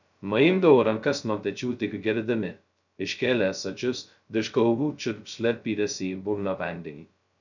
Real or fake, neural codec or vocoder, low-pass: fake; codec, 16 kHz, 0.2 kbps, FocalCodec; 7.2 kHz